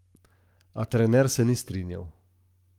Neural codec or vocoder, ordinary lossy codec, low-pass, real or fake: codec, 44.1 kHz, 7.8 kbps, Pupu-Codec; Opus, 32 kbps; 19.8 kHz; fake